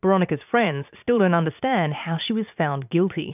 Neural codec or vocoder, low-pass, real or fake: vocoder, 44.1 kHz, 80 mel bands, Vocos; 3.6 kHz; fake